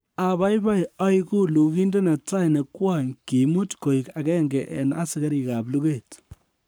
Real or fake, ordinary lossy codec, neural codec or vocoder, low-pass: fake; none; codec, 44.1 kHz, 7.8 kbps, Pupu-Codec; none